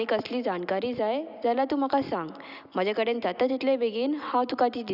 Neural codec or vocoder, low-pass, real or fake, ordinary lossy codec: none; 5.4 kHz; real; none